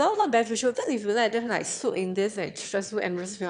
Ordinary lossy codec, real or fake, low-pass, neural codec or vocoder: none; fake; 9.9 kHz; autoencoder, 22.05 kHz, a latent of 192 numbers a frame, VITS, trained on one speaker